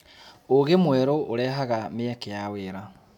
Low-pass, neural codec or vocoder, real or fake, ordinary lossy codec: 19.8 kHz; none; real; none